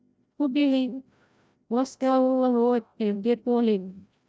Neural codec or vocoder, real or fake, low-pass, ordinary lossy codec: codec, 16 kHz, 0.5 kbps, FreqCodec, larger model; fake; none; none